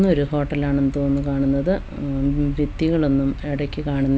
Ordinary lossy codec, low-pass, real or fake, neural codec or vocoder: none; none; real; none